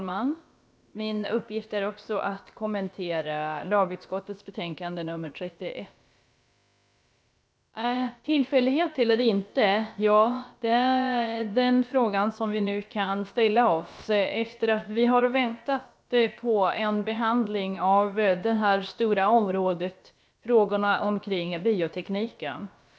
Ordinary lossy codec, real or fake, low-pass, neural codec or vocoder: none; fake; none; codec, 16 kHz, about 1 kbps, DyCAST, with the encoder's durations